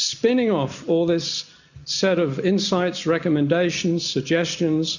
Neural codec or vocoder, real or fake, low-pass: none; real; 7.2 kHz